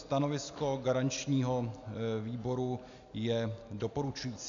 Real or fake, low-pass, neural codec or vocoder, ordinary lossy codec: real; 7.2 kHz; none; AAC, 64 kbps